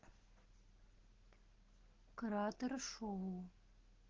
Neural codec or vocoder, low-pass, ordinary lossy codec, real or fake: none; 7.2 kHz; Opus, 16 kbps; real